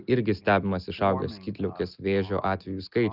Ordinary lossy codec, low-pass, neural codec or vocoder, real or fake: Opus, 32 kbps; 5.4 kHz; none; real